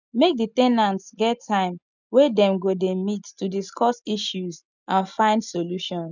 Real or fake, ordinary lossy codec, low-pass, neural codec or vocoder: real; none; 7.2 kHz; none